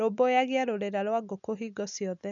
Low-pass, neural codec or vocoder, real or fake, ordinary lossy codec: 7.2 kHz; none; real; none